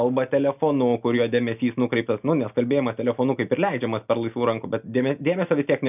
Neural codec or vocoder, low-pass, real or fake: none; 3.6 kHz; real